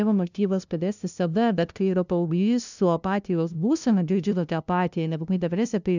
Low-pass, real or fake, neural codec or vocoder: 7.2 kHz; fake; codec, 16 kHz, 0.5 kbps, FunCodec, trained on LibriTTS, 25 frames a second